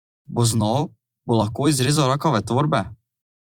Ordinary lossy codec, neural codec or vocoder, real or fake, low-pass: Opus, 64 kbps; vocoder, 44.1 kHz, 128 mel bands every 256 samples, BigVGAN v2; fake; 19.8 kHz